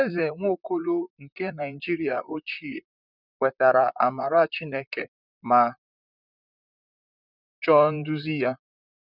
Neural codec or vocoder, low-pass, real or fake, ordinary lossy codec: vocoder, 44.1 kHz, 128 mel bands, Pupu-Vocoder; 5.4 kHz; fake; none